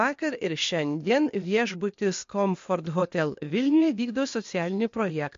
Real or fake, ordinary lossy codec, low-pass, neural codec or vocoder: fake; MP3, 48 kbps; 7.2 kHz; codec, 16 kHz, 0.8 kbps, ZipCodec